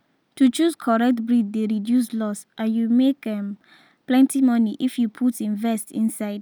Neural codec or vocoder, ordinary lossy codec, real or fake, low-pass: none; none; real; none